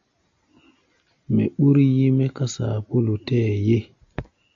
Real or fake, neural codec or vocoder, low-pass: real; none; 7.2 kHz